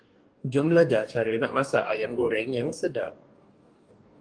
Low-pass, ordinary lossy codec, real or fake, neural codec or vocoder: 9.9 kHz; Opus, 32 kbps; fake; codec, 44.1 kHz, 2.6 kbps, DAC